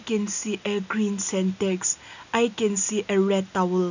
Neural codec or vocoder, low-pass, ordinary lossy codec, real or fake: none; 7.2 kHz; none; real